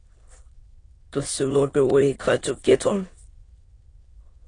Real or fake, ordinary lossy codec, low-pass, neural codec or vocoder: fake; AAC, 32 kbps; 9.9 kHz; autoencoder, 22.05 kHz, a latent of 192 numbers a frame, VITS, trained on many speakers